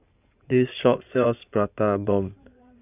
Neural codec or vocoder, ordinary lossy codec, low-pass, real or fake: codec, 16 kHz in and 24 kHz out, 2.2 kbps, FireRedTTS-2 codec; none; 3.6 kHz; fake